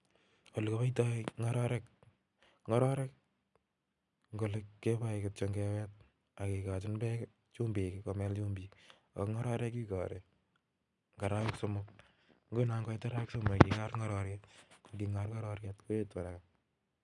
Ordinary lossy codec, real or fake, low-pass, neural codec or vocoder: none; real; 10.8 kHz; none